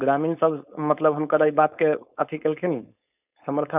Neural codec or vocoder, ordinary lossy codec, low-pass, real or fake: codec, 16 kHz, 4.8 kbps, FACodec; none; 3.6 kHz; fake